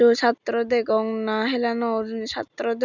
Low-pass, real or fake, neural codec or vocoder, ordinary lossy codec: 7.2 kHz; real; none; none